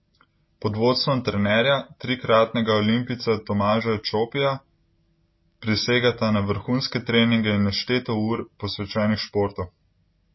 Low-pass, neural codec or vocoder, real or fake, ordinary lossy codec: 7.2 kHz; none; real; MP3, 24 kbps